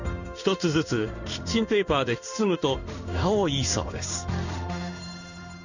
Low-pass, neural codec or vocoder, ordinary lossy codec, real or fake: 7.2 kHz; codec, 16 kHz in and 24 kHz out, 1 kbps, XY-Tokenizer; none; fake